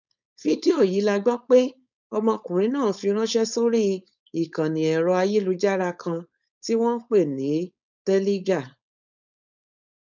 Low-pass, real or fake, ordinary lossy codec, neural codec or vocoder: 7.2 kHz; fake; none; codec, 16 kHz, 4.8 kbps, FACodec